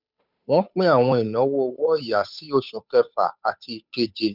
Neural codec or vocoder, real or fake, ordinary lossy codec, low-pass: codec, 16 kHz, 8 kbps, FunCodec, trained on Chinese and English, 25 frames a second; fake; none; 5.4 kHz